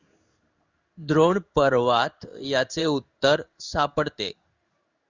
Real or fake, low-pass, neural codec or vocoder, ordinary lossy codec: fake; 7.2 kHz; codec, 16 kHz in and 24 kHz out, 1 kbps, XY-Tokenizer; Opus, 64 kbps